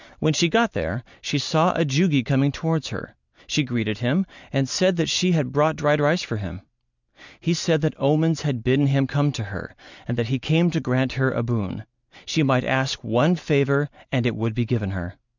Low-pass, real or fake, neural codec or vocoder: 7.2 kHz; real; none